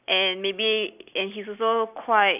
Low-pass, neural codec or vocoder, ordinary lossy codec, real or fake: 3.6 kHz; none; none; real